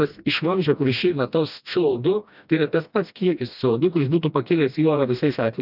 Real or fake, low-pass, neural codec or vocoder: fake; 5.4 kHz; codec, 16 kHz, 1 kbps, FreqCodec, smaller model